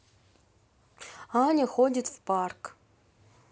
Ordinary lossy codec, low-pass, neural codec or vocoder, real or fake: none; none; none; real